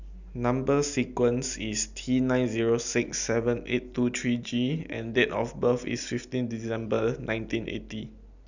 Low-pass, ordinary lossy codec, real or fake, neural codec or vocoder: 7.2 kHz; none; real; none